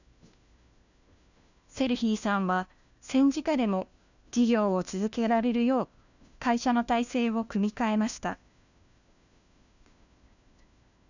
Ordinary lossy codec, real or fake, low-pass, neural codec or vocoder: none; fake; 7.2 kHz; codec, 16 kHz, 1 kbps, FunCodec, trained on LibriTTS, 50 frames a second